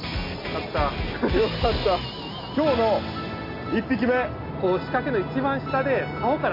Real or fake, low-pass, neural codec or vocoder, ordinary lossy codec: real; 5.4 kHz; none; AAC, 32 kbps